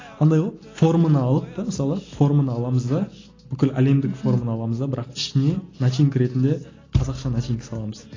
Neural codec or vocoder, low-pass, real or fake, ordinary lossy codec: none; 7.2 kHz; real; AAC, 32 kbps